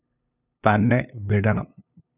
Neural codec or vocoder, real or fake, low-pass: codec, 16 kHz, 2 kbps, FunCodec, trained on LibriTTS, 25 frames a second; fake; 3.6 kHz